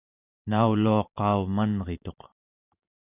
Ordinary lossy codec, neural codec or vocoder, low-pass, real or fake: AAC, 32 kbps; vocoder, 44.1 kHz, 128 mel bands every 512 samples, BigVGAN v2; 3.6 kHz; fake